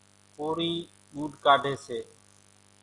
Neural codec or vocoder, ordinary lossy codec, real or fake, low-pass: none; Opus, 64 kbps; real; 10.8 kHz